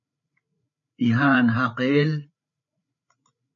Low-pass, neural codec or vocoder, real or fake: 7.2 kHz; codec, 16 kHz, 8 kbps, FreqCodec, larger model; fake